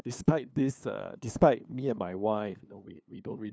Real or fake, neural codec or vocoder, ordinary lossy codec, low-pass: fake; codec, 16 kHz, 2 kbps, FunCodec, trained on LibriTTS, 25 frames a second; none; none